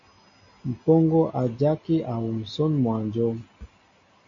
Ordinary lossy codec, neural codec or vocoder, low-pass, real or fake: MP3, 48 kbps; none; 7.2 kHz; real